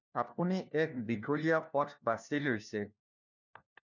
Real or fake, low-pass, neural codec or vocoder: fake; 7.2 kHz; codec, 16 kHz, 1 kbps, FunCodec, trained on LibriTTS, 50 frames a second